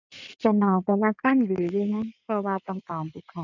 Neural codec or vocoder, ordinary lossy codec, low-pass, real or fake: codec, 16 kHz in and 24 kHz out, 2.2 kbps, FireRedTTS-2 codec; none; 7.2 kHz; fake